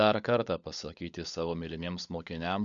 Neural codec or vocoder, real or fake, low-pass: codec, 16 kHz, 16 kbps, FunCodec, trained on LibriTTS, 50 frames a second; fake; 7.2 kHz